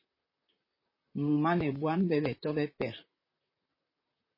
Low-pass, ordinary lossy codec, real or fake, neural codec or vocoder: 5.4 kHz; MP3, 24 kbps; fake; vocoder, 44.1 kHz, 128 mel bands, Pupu-Vocoder